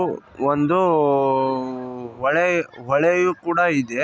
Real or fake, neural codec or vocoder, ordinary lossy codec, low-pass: real; none; none; none